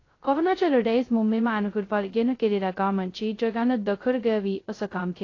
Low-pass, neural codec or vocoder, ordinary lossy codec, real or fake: 7.2 kHz; codec, 16 kHz, 0.2 kbps, FocalCodec; AAC, 32 kbps; fake